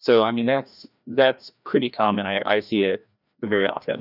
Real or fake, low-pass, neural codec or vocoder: fake; 5.4 kHz; codec, 16 kHz, 1 kbps, FreqCodec, larger model